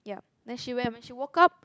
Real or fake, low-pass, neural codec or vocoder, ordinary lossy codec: real; none; none; none